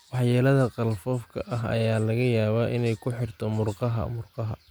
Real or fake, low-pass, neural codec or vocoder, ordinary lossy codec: real; none; none; none